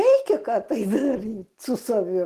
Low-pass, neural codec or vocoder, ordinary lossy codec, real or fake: 14.4 kHz; none; Opus, 16 kbps; real